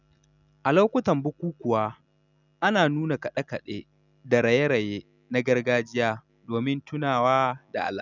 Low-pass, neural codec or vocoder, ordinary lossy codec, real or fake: 7.2 kHz; none; none; real